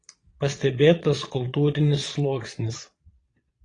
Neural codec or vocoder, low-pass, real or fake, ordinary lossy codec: vocoder, 22.05 kHz, 80 mel bands, Vocos; 9.9 kHz; fake; AAC, 32 kbps